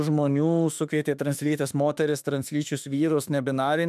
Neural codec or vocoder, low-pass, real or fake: autoencoder, 48 kHz, 32 numbers a frame, DAC-VAE, trained on Japanese speech; 14.4 kHz; fake